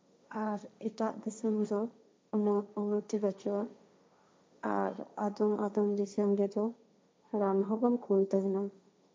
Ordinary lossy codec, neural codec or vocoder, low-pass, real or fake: none; codec, 16 kHz, 1.1 kbps, Voila-Tokenizer; none; fake